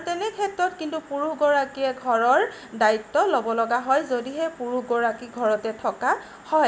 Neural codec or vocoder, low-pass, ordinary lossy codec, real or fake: none; none; none; real